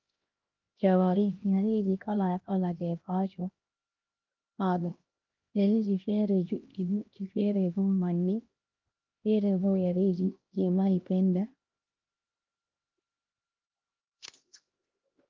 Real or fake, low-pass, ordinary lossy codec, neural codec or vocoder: fake; 7.2 kHz; Opus, 32 kbps; codec, 16 kHz, 1 kbps, X-Codec, HuBERT features, trained on LibriSpeech